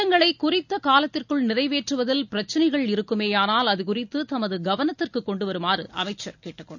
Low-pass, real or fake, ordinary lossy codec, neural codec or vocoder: 7.2 kHz; real; none; none